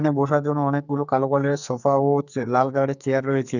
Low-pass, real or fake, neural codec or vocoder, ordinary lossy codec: 7.2 kHz; fake; codec, 44.1 kHz, 2.6 kbps, SNAC; none